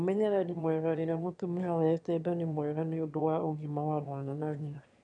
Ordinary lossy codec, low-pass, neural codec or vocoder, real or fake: none; 9.9 kHz; autoencoder, 22.05 kHz, a latent of 192 numbers a frame, VITS, trained on one speaker; fake